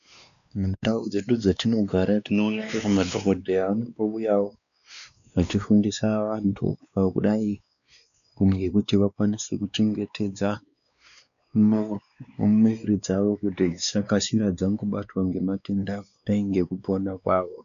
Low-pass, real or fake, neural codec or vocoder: 7.2 kHz; fake; codec, 16 kHz, 2 kbps, X-Codec, WavLM features, trained on Multilingual LibriSpeech